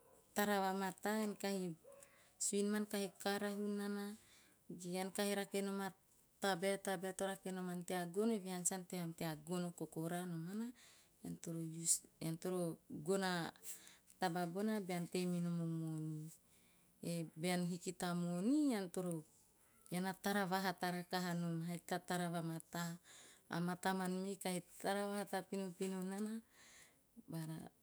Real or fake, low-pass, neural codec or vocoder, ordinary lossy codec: fake; none; autoencoder, 48 kHz, 128 numbers a frame, DAC-VAE, trained on Japanese speech; none